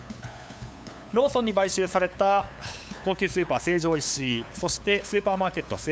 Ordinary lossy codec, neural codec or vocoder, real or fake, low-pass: none; codec, 16 kHz, 8 kbps, FunCodec, trained on LibriTTS, 25 frames a second; fake; none